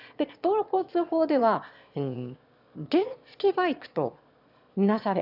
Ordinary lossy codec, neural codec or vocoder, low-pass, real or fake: none; autoencoder, 22.05 kHz, a latent of 192 numbers a frame, VITS, trained on one speaker; 5.4 kHz; fake